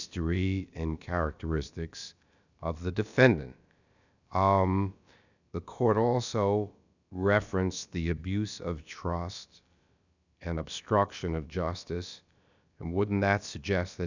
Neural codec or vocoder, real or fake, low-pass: codec, 16 kHz, about 1 kbps, DyCAST, with the encoder's durations; fake; 7.2 kHz